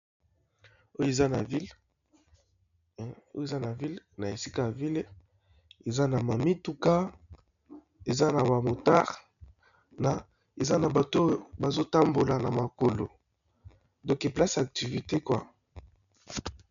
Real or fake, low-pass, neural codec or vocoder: real; 7.2 kHz; none